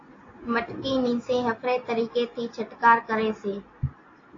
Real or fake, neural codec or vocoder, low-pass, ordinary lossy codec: real; none; 7.2 kHz; AAC, 32 kbps